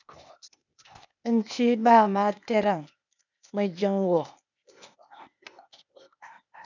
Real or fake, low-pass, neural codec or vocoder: fake; 7.2 kHz; codec, 16 kHz, 0.8 kbps, ZipCodec